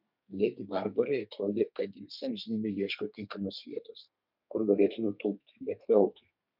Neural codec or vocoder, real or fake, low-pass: codec, 32 kHz, 1.9 kbps, SNAC; fake; 5.4 kHz